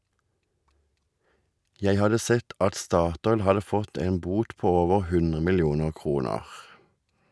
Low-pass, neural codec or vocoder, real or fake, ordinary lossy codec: none; none; real; none